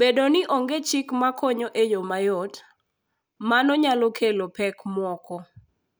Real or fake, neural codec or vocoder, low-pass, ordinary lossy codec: real; none; none; none